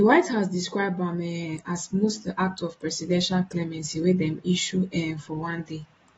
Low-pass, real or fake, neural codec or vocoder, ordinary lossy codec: 9.9 kHz; real; none; AAC, 24 kbps